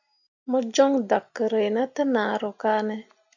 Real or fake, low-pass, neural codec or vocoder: real; 7.2 kHz; none